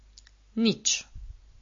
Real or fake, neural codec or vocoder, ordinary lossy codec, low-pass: real; none; MP3, 32 kbps; 7.2 kHz